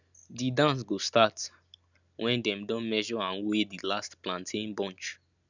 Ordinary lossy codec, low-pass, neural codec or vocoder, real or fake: none; 7.2 kHz; none; real